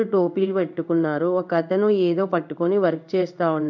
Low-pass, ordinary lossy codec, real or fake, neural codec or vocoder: 7.2 kHz; none; fake; codec, 16 kHz in and 24 kHz out, 1 kbps, XY-Tokenizer